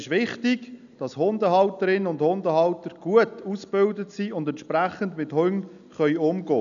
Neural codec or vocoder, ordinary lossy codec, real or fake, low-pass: none; none; real; 7.2 kHz